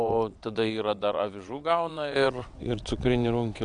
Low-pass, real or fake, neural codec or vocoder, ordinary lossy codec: 9.9 kHz; fake; vocoder, 22.05 kHz, 80 mel bands, WaveNeXt; AAC, 64 kbps